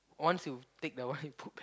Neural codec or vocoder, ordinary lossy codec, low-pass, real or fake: none; none; none; real